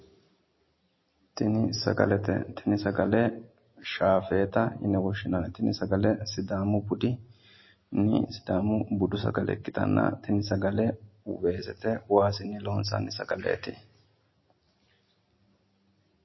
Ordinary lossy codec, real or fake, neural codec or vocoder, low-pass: MP3, 24 kbps; real; none; 7.2 kHz